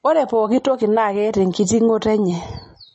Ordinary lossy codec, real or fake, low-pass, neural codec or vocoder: MP3, 32 kbps; real; 9.9 kHz; none